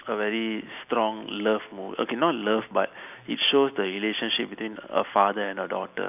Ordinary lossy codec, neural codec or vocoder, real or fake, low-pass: none; none; real; 3.6 kHz